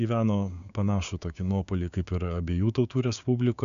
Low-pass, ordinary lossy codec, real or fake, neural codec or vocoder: 7.2 kHz; MP3, 96 kbps; fake; codec, 16 kHz, 6 kbps, DAC